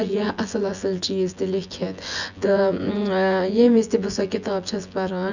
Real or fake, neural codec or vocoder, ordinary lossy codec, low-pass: fake; vocoder, 24 kHz, 100 mel bands, Vocos; none; 7.2 kHz